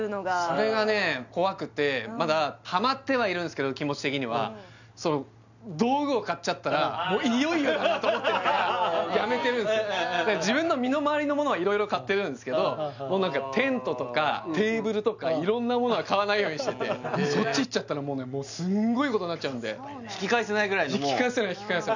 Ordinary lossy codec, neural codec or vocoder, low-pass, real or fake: none; none; 7.2 kHz; real